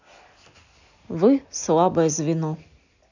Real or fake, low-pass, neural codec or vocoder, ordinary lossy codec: real; 7.2 kHz; none; none